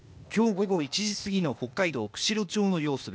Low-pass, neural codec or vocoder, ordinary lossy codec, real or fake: none; codec, 16 kHz, 0.8 kbps, ZipCodec; none; fake